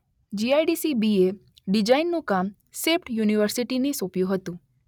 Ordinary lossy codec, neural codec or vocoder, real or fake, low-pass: none; none; real; 19.8 kHz